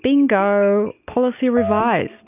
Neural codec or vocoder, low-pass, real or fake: none; 3.6 kHz; real